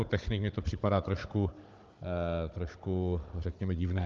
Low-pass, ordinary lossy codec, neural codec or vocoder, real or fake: 7.2 kHz; Opus, 24 kbps; codec, 16 kHz, 16 kbps, FunCodec, trained on Chinese and English, 50 frames a second; fake